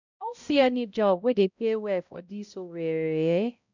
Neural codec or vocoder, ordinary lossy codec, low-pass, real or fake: codec, 16 kHz, 0.5 kbps, X-Codec, HuBERT features, trained on balanced general audio; none; 7.2 kHz; fake